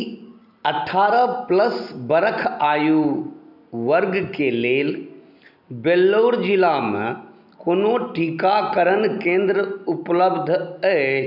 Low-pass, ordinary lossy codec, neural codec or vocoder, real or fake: 5.4 kHz; none; none; real